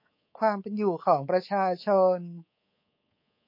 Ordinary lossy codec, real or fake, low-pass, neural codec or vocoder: MP3, 32 kbps; fake; 5.4 kHz; codec, 24 kHz, 3.1 kbps, DualCodec